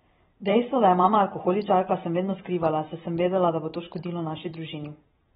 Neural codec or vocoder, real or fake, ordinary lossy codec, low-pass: vocoder, 44.1 kHz, 128 mel bands every 512 samples, BigVGAN v2; fake; AAC, 16 kbps; 19.8 kHz